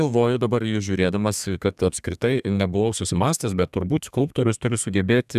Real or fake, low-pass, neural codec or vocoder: fake; 14.4 kHz; codec, 32 kHz, 1.9 kbps, SNAC